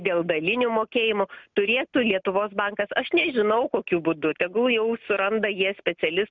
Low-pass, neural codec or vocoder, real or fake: 7.2 kHz; none; real